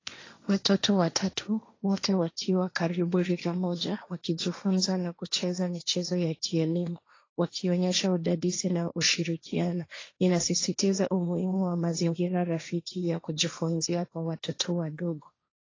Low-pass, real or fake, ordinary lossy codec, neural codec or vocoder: 7.2 kHz; fake; AAC, 32 kbps; codec, 16 kHz, 1.1 kbps, Voila-Tokenizer